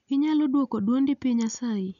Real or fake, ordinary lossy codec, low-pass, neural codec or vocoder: real; none; 7.2 kHz; none